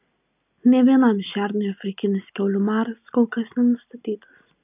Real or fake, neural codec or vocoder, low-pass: real; none; 3.6 kHz